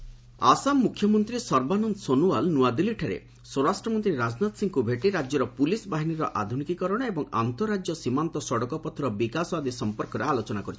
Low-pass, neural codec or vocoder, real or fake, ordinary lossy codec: none; none; real; none